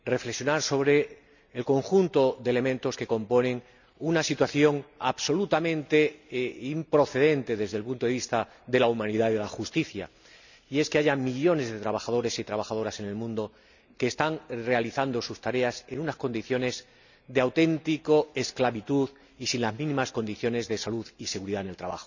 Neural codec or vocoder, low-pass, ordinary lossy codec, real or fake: none; 7.2 kHz; none; real